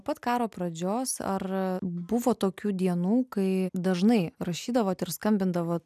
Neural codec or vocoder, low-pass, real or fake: none; 14.4 kHz; real